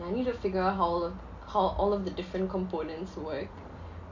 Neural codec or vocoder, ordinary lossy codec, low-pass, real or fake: none; MP3, 48 kbps; 7.2 kHz; real